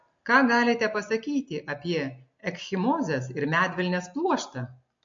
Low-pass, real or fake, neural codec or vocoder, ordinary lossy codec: 7.2 kHz; real; none; MP3, 48 kbps